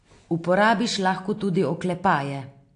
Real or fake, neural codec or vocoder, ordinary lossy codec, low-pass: real; none; AAC, 48 kbps; 9.9 kHz